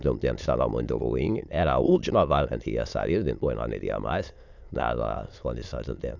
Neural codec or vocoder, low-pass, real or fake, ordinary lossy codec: autoencoder, 22.05 kHz, a latent of 192 numbers a frame, VITS, trained on many speakers; 7.2 kHz; fake; none